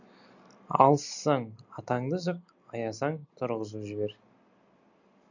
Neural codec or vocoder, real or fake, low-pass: none; real; 7.2 kHz